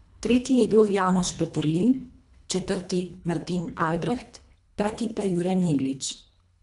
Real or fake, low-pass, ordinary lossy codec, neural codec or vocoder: fake; 10.8 kHz; none; codec, 24 kHz, 1.5 kbps, HILCodec